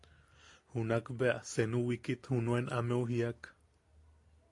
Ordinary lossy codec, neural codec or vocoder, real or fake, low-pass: AAC, 48 kbps; none; real; 10.8 kHz